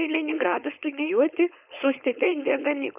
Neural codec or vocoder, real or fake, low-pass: codec, 16 kHz, 4.8 kbps, FACodec; fake; 3.6 kHz